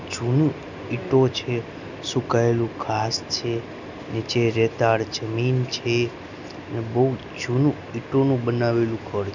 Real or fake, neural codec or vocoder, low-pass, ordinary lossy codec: real; none; 7.2 kHz; none